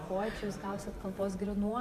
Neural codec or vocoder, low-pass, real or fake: vocoder, 44.1 kHz, 128 mel bands every 512 samples, BigVGAN v2; 14.4 kHz; fake